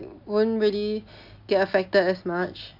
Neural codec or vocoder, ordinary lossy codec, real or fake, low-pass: none; AAC, 48 kbps; real; 5.4 kHz